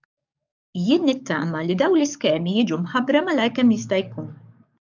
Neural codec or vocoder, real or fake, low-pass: codec, 44.1 kHz, 7.8 kbps, DAC; fake; 7.2 kHz